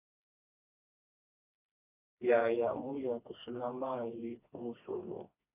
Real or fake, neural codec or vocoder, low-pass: fake; codec, 16 kHz, 2 kbps, FreqCodec, smaller model; 3.6 kHz